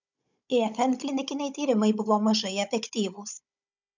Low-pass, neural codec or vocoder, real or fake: 7.2 kHz; codec, 16 kHz, 16 kbps, FunCodec, trained on Chinese and English, 50 frames a second; fake